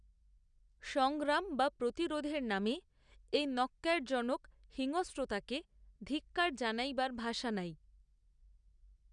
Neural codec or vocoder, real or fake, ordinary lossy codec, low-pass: none; real; none; 9.9 kHz